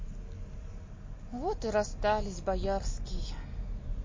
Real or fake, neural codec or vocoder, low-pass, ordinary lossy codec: real; none; 7.2 kHz; MP3, 32 kbps